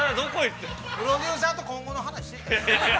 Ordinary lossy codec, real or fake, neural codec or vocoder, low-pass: none; real; none; none